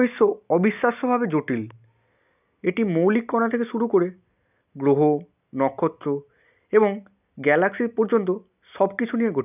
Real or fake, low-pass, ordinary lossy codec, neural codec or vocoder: real; 3.6 kHz; none; none